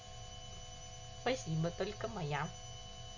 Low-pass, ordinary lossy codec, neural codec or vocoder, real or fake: 7.2 kHz; none; none; real